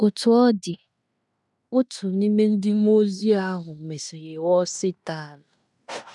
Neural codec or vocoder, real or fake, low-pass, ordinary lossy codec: codec, 16 kHz in and 24 kHz out, 0.9 kbps, LongCat-Audio-Codec, fine tuned four codebook decoder; fake; 10.8 kHz; none